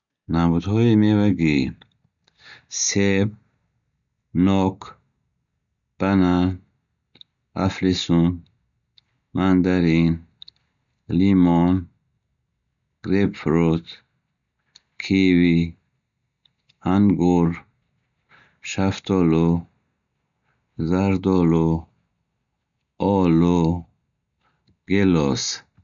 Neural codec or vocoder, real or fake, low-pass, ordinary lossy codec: none; real; 7.2 kHz; none